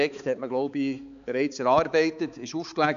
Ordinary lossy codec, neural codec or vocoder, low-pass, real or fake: none; codec, 16 kHz, 4 kbps, X-Codec, HuBERT features, trained on balanced general audio; 7.2 kHz; fake